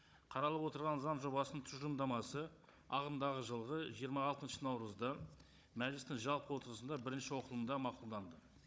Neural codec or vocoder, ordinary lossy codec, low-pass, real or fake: codec, 16 kHz, 16 kbps, FunCodec, trained on Chinese and English, 50 frames a second; none; none; fake